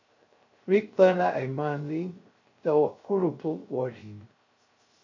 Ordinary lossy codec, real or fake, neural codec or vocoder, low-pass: MP3, 48 kbps; fake; codec, 16 kHz, 0.3 kbps, FocalCodec; 7.2 kHz